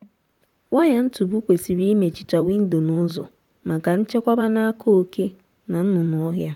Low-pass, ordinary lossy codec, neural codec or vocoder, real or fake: 19.8 kHz; none; vocoder, 44.1 kHz, 128 mel bands, Pupu-Vocoder; fake